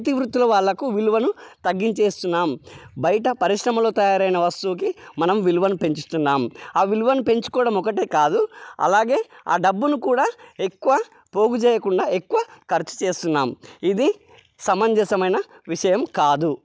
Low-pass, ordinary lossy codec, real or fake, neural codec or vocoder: none; none; real; none